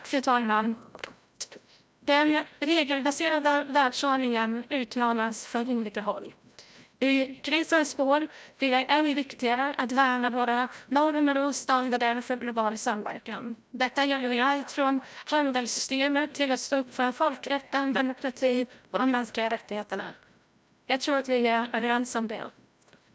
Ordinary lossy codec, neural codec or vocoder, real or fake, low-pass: none; codec, 16 kHz, 0.5 kbps, FreqCodec, larger model; fake; none